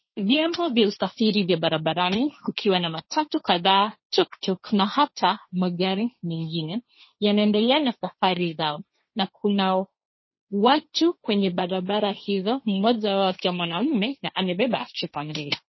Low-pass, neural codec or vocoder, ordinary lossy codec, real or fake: 7.2 kHz; codec, 16 kHz, 1.1 kbps, Voila-Tokenizer; MP3, 24 kbps; fake